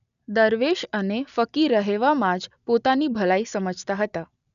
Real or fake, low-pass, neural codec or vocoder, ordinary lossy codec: real; 7.2 kHz; none; none